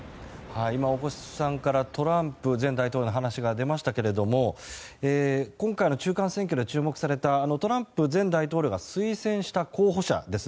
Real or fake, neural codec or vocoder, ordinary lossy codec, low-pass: real; none; none; none